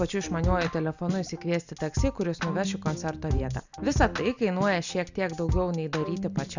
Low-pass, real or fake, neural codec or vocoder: 7.2 kHz; real; none